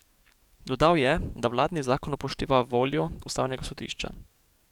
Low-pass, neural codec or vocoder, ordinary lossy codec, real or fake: 19.8 kHz; codec, 44.1 kHz, 7.8 kbps, DAC; none; fake